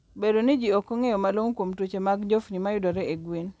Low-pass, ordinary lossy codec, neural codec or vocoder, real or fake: none; none; none; real